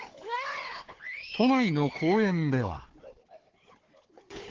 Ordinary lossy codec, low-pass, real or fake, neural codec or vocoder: Opus, 16 kbps; 7.2 kHz; fake; codec, 16 kHz, 4 kbps, FunCodec, trained on Chinese and English, 50 frames a second